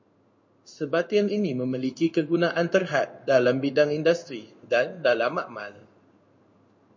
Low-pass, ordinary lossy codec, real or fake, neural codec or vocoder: 7.2 kHz; MP3, 64 kbps; fake; codec, 16 kHz in and 24 kHz out, 1 kbps, XY-Tokenizer